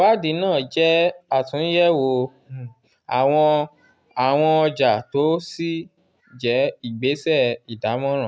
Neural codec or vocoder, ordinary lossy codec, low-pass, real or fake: none; none; none; real